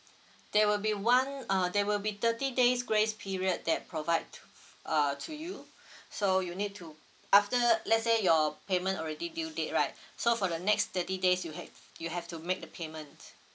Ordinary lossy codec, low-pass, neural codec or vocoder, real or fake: none; none; none; real